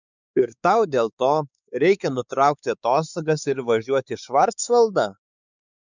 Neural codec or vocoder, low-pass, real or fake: codec, 16 kHz, 4 kbps, X-Codec, WavLM features, trained on Multilingual LibriSpeech; 7.2 kHz; fake